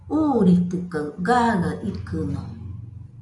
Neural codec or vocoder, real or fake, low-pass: none; real; 10.8 kHz